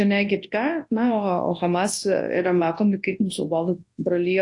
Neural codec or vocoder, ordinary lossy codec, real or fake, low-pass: codec, 24 kHz, 0.9 kbps, WavTokenizer, large speech release; AAC, 48 kbps; fake; 10.8 kHz